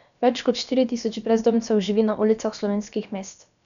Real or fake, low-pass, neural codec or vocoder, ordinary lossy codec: fake; 7.2 kHz; codec, 16 kHz, about 1 kbps, DyCAST, with the encoder's durations; none